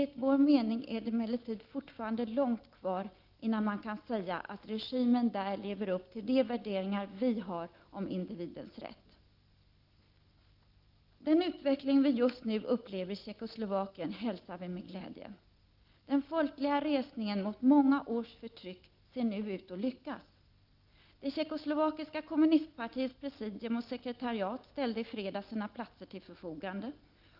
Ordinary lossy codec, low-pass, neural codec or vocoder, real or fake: Opus, 32 kbps; 5.4 kHz; none; real